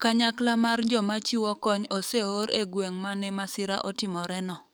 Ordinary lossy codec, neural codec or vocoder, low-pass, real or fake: none; codec, 44.1 kHz, 7.8 kbps, DAC; none; fake